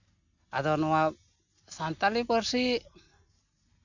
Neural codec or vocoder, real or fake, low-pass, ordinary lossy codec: none; real; 7.2 kHz; none